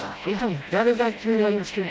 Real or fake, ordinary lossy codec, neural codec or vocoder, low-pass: fake; none; codec, 16 kHz, 0.5 kbps, FreqCodec, smaller model; none